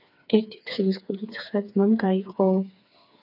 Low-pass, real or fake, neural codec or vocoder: 5.4 kHz; fake; codec, 16 kHz, 4 kbps, FreqCodec, smaller model